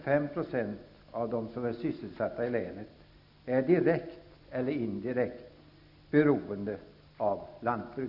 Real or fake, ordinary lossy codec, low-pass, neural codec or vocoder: real; none; 5.4 kHz; none